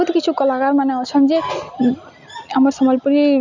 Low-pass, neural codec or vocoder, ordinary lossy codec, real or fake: 7.2 kHz; none; none; real